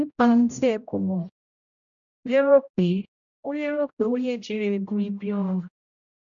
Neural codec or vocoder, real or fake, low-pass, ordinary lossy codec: codec, 16 kHz, 0.5 kbps, X-Codec, HuBERT features, trained on general audio; fake; 7.2 kHz; none